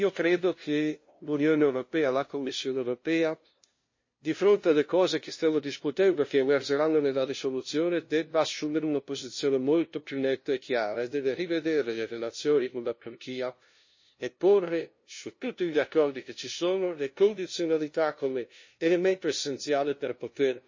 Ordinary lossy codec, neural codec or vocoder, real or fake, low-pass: MP3, 32 kbps; codec, 16 kHz, 0.5 kbps, FunCodec, trained on LibriTTS, 25 frames a second; fake; 7.2 kHz